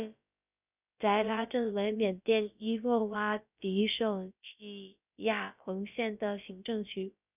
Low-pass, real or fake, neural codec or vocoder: 3.6 kHz; fake; codec, 16 kHz, about 1 kbps, DyCAST, with the encoder's durations